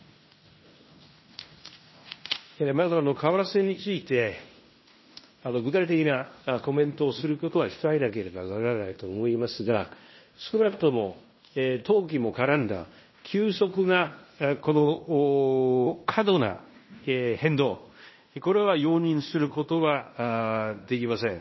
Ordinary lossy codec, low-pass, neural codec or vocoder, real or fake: MP3, 24 kbps; 7.2 kHz; codec, 16 kHz in and 24 kHz out, 0.9 kbps, LongCat-Audio-Codec, fine tuned four codebook decoder; fake